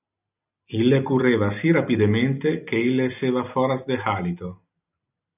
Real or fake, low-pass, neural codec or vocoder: real; 3.6 kHz; none